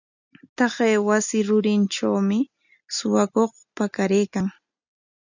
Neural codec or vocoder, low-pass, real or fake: none; 7.2 kHz; real